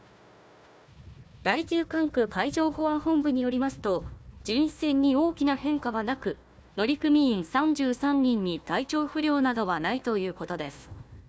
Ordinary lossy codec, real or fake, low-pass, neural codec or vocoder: none; fake; none; codec, 16 kHz, 1 kbps, FunCodec, trained on Chinese and English, 50 frames a second